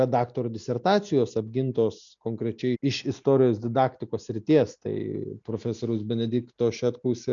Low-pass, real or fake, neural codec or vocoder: 7.2 kHz; real; none